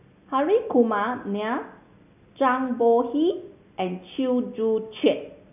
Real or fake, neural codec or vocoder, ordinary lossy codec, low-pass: real; none; none; 3.6 kHz